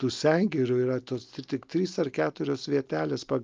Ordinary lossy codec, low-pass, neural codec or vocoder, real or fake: Opus, 24 kbps; 7.2 kHz; none; real